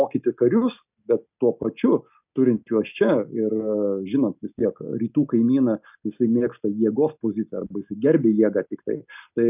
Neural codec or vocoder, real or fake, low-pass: none; real; 3.6 kHz